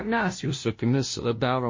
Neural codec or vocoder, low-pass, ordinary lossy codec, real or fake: codec, 16 kHz, 0.5 kbps, FunCodec, trained on LibriTTS, 25 frames a second; 7.2 kHz; MP3, 32 kbps; fake